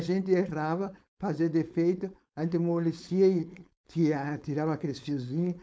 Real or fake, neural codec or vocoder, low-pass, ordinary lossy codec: fake; codec, 16 kHz, 4.8 kbps, FACodec; none; none